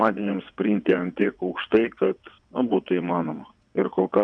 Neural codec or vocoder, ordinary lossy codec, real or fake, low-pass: vocoder, 22.05 kHz, 80 mel bands, WaveNeXt; AAC, 64 kbps; fake; 9.9 kHz